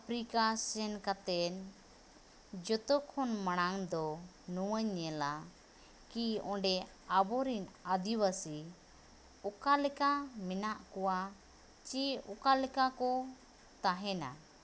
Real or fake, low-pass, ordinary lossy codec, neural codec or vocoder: real; none; none; none